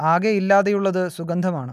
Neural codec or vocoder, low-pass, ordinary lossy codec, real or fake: none; 14.4 kHz; none; real